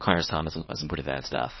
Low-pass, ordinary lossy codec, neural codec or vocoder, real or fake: 7.2 kHz; MP3, 24 kbps; codec, 24 kHz, 0.9 kbps, WavTokenizer, small release; fake